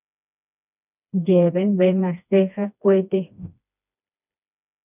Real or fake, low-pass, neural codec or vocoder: fake; 3.6 kHz; codec, 16 kHz, 2 kbps, FreqCodec, smaller model